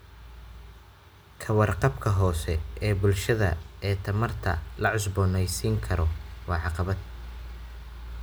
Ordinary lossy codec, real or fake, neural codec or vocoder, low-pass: none; real; none; none